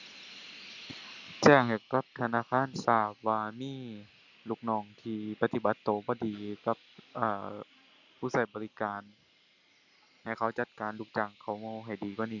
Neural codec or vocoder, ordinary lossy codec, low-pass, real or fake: none; none; 7.2 kHz; real